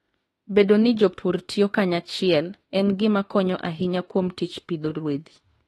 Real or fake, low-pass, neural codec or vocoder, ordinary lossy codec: fake; 19.8 kHz; autoencoder, 48 kHz, 32 numbers a frame, DAC-VAE, trained on Japanese speech; AAC, 32 kbps